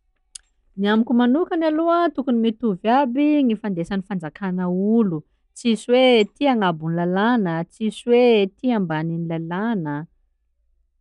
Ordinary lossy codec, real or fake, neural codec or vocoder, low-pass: none; real; none; 10.8 kHz